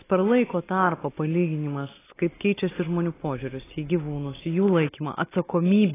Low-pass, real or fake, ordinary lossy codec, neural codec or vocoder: 3.6 kHz; real; AAC, 16 kbps; none